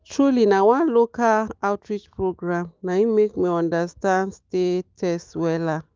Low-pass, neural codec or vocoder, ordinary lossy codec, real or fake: 7.2 kHz; autoencoder, 48 kHz, 128 numbers a frame, DAC-VAE, trained on Japanese speech; Opus, 32 kbps; fake